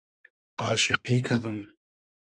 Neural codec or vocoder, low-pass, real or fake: codec, 24 kHz, 1 kbps, SNAC; 9.9 kHz; fake